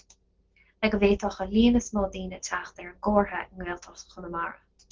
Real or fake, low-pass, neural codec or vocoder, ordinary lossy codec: real; 7.2 kHz; none; Opus, 16 kbps